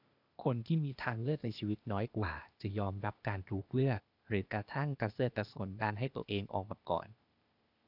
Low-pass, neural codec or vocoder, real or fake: 5.4 kHz; codec, 16 kHz, 0.8 kbps, ZipCodec; fake